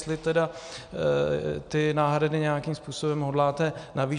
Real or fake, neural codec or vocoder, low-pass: real; none; 9.9 kHz